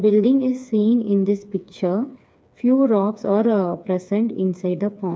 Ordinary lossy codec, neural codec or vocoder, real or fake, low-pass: none; codec, 16 kHz, 4 kbps, FreqCodec, smaller model; fake; none